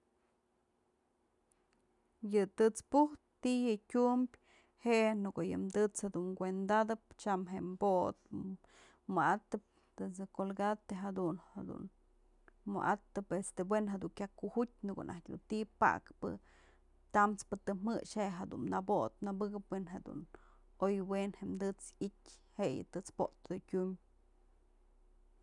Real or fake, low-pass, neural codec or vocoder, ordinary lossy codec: real; 10.8 kHz; none; none